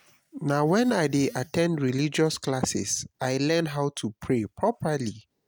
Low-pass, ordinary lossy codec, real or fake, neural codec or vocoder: none; none; real; none